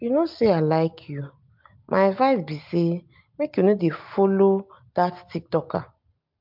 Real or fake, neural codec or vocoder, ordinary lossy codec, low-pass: real; none; MP3, 48 kbps; 5.4 kHz